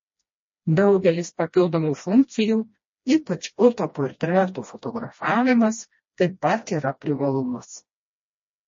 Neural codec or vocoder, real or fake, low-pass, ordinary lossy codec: codec, 16 kHz, 1 kbps, FreqCodec, smaller model; fake; 7.2 kHz; MP3, 32 kbps